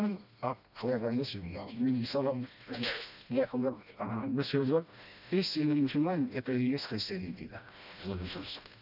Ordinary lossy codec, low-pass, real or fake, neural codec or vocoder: none; 5.4 kHz; fake; codec, 16 kHz, 1 kbps, FreqCodec, smaller model